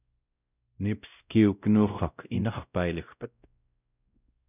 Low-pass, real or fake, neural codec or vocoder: 3.6 kHz; fake; codec, 16 kHz, 0.5 kbps, X-Codec, WavLM features, trained on Multilingual LibriSpeech